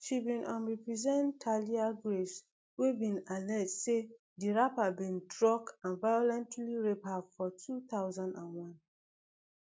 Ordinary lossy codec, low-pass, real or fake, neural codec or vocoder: none; none; real; none